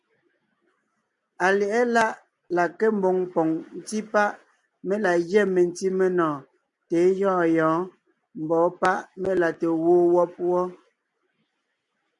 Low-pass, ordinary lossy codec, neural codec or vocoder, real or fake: 10.8 kHz; MP3, 96 kbps; none; real